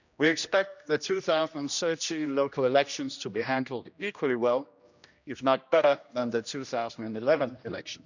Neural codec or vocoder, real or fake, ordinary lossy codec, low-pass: codec, 16 kHz, 1 kbps, X-Codec, HuBERT features, trained on general audio; fake; none; 7.2 kHz